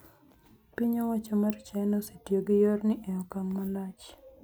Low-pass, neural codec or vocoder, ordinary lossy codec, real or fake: none; none; none; real